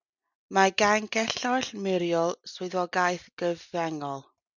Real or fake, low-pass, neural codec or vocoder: real; 7.2 kHz; none